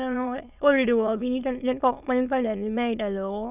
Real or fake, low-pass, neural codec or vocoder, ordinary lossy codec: fake; 3.6 kHz; autoencoder, 22.05 kHz, a latent of 192 numbers a frame, VITS, trained on many speakers; none